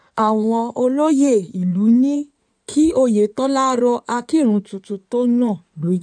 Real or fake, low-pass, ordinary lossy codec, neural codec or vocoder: fake; 9.9 kHz; none; codec, 16 kHz in and 24 kHz out, 2.2 kbps, FireRedTTS-2 codec